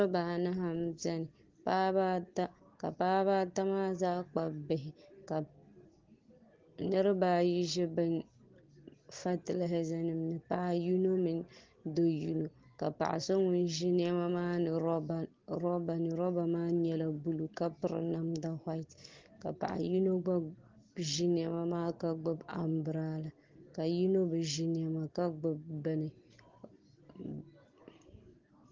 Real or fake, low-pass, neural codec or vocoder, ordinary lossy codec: real; 7.2 kHz; none; Opus, 16 kbps